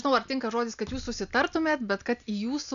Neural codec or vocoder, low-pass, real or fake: none; 7.2 kHz; real